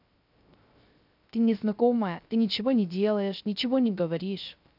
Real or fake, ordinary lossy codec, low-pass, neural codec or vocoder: fake; none; 5.4 kHz; codec, 16 kHz, 0.3 kbps, FocalCodec